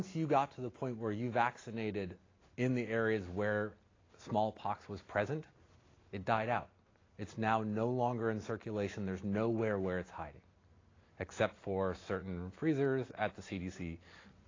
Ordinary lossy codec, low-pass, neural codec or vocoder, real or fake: AAC, 32 kbps; 7.2 kHz; none; real